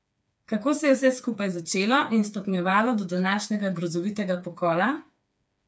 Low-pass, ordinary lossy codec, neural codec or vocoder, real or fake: none; none; codec, 16 kHz, 4 kbps, FreqCodec, smaller model; fake